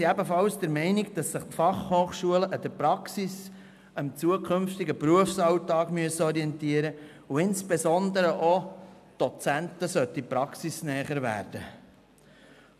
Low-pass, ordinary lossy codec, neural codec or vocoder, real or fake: 14.4 kHz; none; none; real